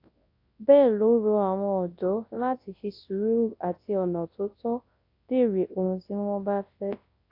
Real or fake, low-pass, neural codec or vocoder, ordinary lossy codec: fake; 5.4 kHz; codec, 24 kHz, 0.9 kbps, WavTokenizer, large speech release; AAC, 32 kbps